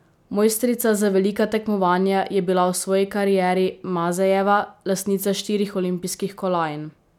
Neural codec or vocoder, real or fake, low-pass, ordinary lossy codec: none; real; 19.8 kHz; none